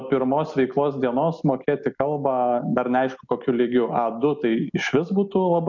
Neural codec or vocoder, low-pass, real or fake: none; 7.2 kHz; real